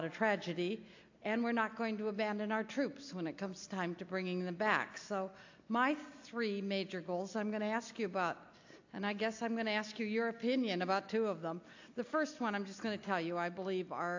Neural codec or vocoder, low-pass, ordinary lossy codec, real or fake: none; 7.2 kHz; AAC, 48 kbps; real